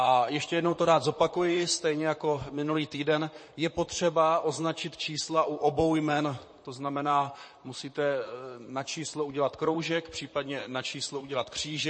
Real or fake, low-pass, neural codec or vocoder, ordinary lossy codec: fake; 9.9 kHz; vocoder, 44.1 kHz, 128 mel bands, Pupu-Vocoder; MP3, 32 kbps